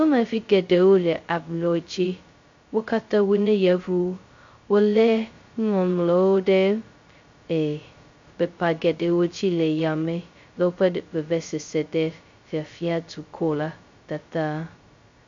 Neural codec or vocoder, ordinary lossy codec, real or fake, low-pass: codec, 16 kHz, 0.2 kbps, FocalCodec; MP3, 48 kbps; fake; 7.2 kHz